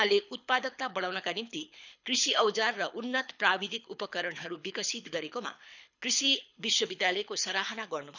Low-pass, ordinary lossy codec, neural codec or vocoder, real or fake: 7.2 kHz; none; codec, 24 kHz, 6 kbps, HILCodec; fake